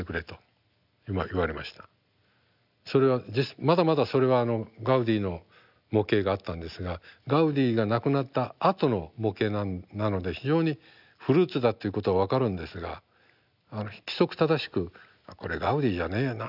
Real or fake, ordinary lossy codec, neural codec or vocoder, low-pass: real; none; none; 5.4 kHz